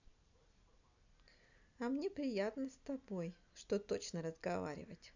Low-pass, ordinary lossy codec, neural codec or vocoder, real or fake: 7.2 kHz; none; none; real